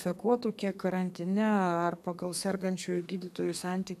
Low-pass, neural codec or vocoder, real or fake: 14.4 kHz; codec, 32 kHz, 1.9 kbps, SNAC; fake